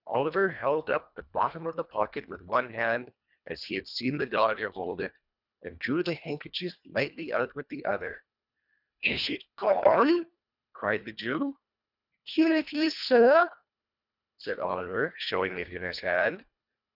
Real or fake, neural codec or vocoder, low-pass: fake; codec, 24 kHz, 1.5 kbps, HILCodec; 5.4 kHz